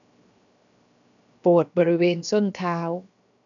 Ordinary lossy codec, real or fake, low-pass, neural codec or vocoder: none; fake; 7.2 kHz; codec, 16 kHz, 0.7 kbps, FocalCodec